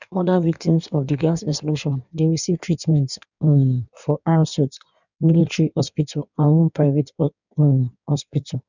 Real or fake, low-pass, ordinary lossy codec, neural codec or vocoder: fake; 7.2 kHz; none; codec, 16 kHz in and 24 kHz out, 1.1 kbps, FireRedTTS-2 codec